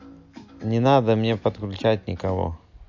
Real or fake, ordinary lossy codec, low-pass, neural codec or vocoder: real; AAC, 48 kbps; 7.2 kHz; none